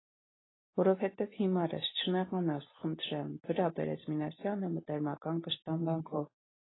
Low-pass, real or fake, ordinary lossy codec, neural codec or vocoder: 7.2 kHz; real; AAC, 16 kbps; none